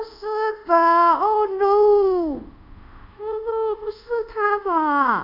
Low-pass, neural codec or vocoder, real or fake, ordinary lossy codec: 5.4 kHz; codec, 24 kHz, 0.5 kbps, DualCodec; fake; none